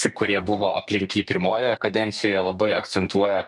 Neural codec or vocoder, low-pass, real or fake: codec, 32 kHz, 1.9 kbps, SNAC; 10.8 kHz; fake